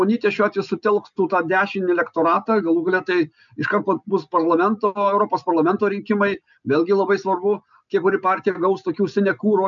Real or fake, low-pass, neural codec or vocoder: real; 7.2 kHz; none